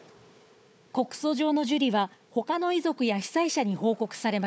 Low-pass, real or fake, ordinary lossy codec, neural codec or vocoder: none; fake; none; codec, 16 kHz, 4 kbps, FunCodec, trained on Chinese and English, 50 frames a second